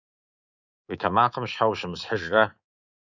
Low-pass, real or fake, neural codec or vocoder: 7.2 kHz; fake; codec, 24 kHz, 3.1 kbps, DualCodec